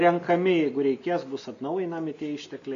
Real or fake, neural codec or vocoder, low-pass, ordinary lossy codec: real; none; 7.2 kHz; MP3, 64 kbps